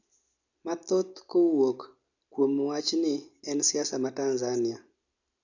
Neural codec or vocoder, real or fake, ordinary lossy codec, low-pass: none; real; none; 7.2 kHz